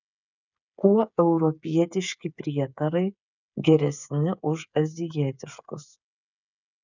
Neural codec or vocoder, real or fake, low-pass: codec, 16 kHz, 8 kbps, FreqCodec, smaller model; fake; 7.2 kHz